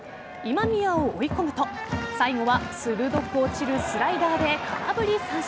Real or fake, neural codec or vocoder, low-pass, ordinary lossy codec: real; none; none; none